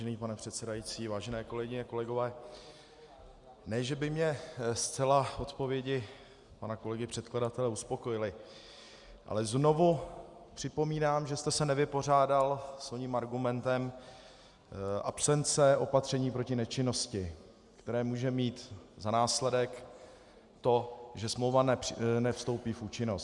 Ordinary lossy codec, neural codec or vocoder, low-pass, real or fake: Opus, 64 kbps; none; 10.8 kHz; real